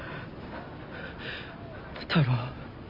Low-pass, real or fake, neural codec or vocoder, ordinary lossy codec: 5.4 kHz; real; none; Opus, 64 kbps